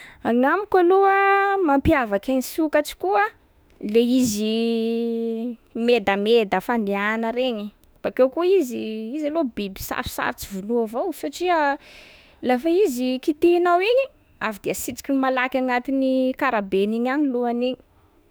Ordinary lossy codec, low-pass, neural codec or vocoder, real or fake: none; none; autoencoder, 48 kHz, 32 numbers a frame, DAC-VAE, trained on Japanese speech; fake